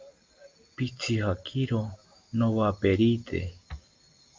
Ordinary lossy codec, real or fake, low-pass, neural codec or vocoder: Opus, 24 kbps; real; 7.2 kHz; none